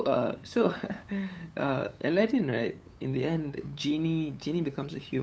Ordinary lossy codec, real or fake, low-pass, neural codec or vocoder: none; fake; none; codec, 16 kHz, 8 kbps, FunCodec, trained on LibriTTS, 25 frames a second